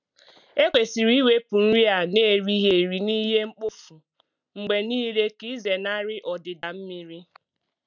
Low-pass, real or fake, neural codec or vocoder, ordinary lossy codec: 7.2 kHz; real; none; none